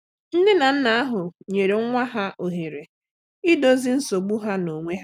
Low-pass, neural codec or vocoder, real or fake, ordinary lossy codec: 19.8 kHz; none; real; none